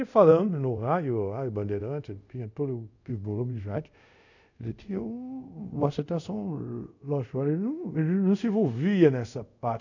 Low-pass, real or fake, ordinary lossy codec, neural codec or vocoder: 7.2 kHz; fake; none; codec, 24 kHz, 0.5 kbps, DualCodec